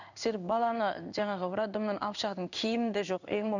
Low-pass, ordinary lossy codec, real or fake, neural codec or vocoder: 7.2 kHz; none; fake; codec, 16 kHz in and 24 kHz out, 1 kbps, XY-Tokenizer